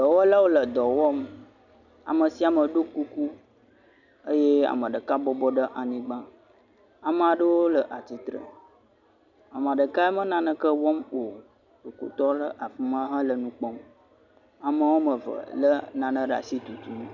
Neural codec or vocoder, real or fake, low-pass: none; real; 7.2 kHz